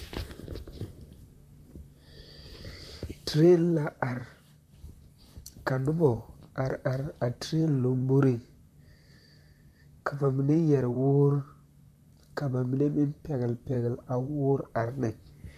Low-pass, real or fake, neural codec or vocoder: 14.4 kHz; fake; vocoder, 44.1 kHz, 128 mel bands, Pupu-Vocoder